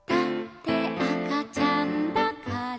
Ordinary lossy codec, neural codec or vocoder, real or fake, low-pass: none; none; real; none